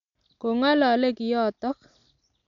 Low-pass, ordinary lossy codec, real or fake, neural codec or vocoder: 7.2 kHz; MP3, 96 kbps; real; none